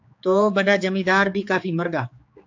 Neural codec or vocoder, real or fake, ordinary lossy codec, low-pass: codec, 16 kHz, 4 kbps, X-Codec, HuBERT features, trained on general audio; fake; AAC, 48 kbps; 7.2 kHz